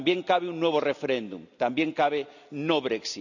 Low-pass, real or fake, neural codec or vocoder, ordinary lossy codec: 7.2 kHz; real; none; none